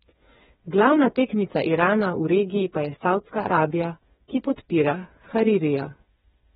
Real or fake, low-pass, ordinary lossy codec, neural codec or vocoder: fake; 7.2 kHz; AAC, 16 kbps; codec, 16 kHz, 4 kbps, FreqCodec, smaller model